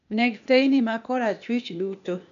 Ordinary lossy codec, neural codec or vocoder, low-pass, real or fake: none; codec, 16 kHz, 0.8 kbps, ZipCodec; 7.2 kHz; fake